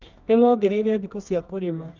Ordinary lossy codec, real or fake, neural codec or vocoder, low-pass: none; fake; codec, 24 kHz, 0.9 kbps, WavTokenizer, medium music audio release; 7.2 kHz